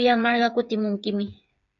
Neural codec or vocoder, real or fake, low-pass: codec, 16 kHz, 8 kbps, FreqCodec, smaller model; fake; 7.2 kHz